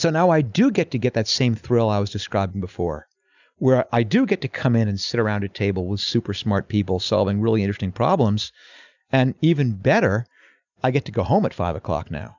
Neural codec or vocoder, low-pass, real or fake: none; 7.2 kHz; real